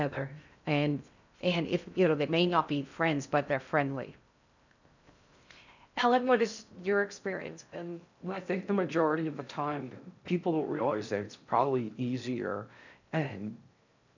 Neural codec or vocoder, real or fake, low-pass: codec, 16 kHz in and 24 kHz out, 0.6 kbps, FocalCodec, streaming, 4096 codes; fake; 7.2 kHz